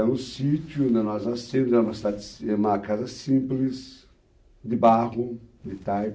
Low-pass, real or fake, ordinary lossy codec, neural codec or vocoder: none; real; none; none